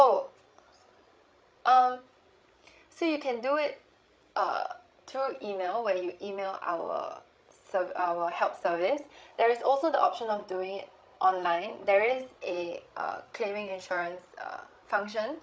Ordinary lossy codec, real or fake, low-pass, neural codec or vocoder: none; fake; none; codec, 16 kHz, 16 kbps, FreqCodec, larger model